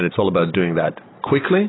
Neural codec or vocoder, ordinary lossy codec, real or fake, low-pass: none; AAC, 16 kbps; real; 7.2 kHz